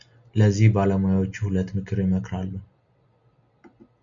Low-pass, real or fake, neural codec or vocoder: 7.2 kHz; real; none